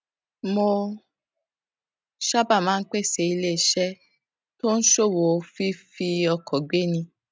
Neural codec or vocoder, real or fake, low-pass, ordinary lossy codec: none; real; none; none